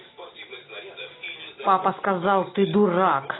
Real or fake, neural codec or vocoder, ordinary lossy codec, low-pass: real; none; AAC, 16 kbps; 7.2 kHz